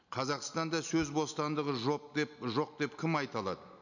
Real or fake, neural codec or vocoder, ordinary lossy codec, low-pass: real; none; none; 7.2 kHz